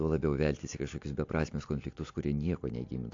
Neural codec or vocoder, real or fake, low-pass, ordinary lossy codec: none; real; 7.2 kHz; AAC, 48 kbps